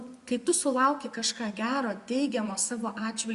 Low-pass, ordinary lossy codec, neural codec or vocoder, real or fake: 10.8 kHz; Opus, 64 kbps; vocoder, 24 kHz, 100 mel bands, Vocos; fake